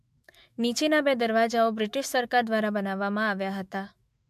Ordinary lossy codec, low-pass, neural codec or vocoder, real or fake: MP3, 64 kbps; 14.4 kHz; autoencoder, 48 kHz, 128 numbers a frame, DAC-VAE, trained on Japanese speech; fake